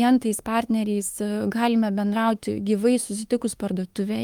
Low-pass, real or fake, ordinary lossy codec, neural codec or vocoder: 19.8 kHz; fake; Opus, 32 kbps; autoencoder, 48 kHz, 32 numbers a frame, DAC-VAE, trained on Japanese speech